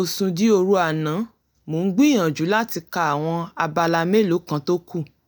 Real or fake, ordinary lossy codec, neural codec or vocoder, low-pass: real; none; none; none